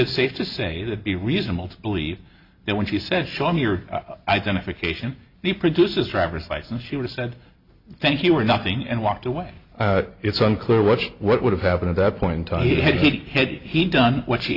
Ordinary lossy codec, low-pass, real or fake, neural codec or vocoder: Opus, 64 kbps; 5.4 kHz; real; none